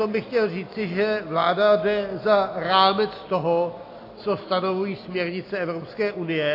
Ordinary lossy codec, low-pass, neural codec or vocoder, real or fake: AAC, 32 kbps; 5.4 kHz; none; real